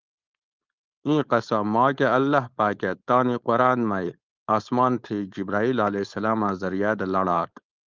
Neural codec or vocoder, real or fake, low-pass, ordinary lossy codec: codec, 16 kHz, 4.8 kbps, FACodec; fake; 7.2 kHz; Opus, 24 kbps